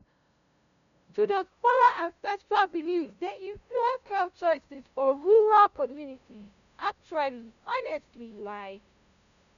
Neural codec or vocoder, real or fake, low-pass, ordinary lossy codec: codec, 16 kHz, 0.5 kbps, FunCodec, trained on LibriTTS, 25 frames a second; fake; 7.2 kHz; none